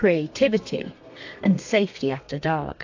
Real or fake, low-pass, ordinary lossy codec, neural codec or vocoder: fake; 7.2 kHz; AAC, 48 kbps; codec, 32 kHz, 1.9 kbps, SNAC